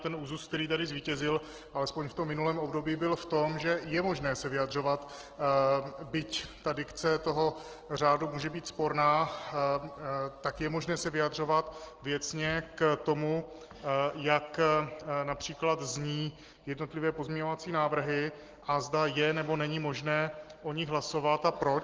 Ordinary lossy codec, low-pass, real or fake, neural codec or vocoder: Opus, 16 kbps; 7.2 kHz; real; none